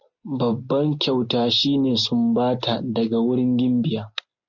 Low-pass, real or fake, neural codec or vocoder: 7.2 kHz; real; none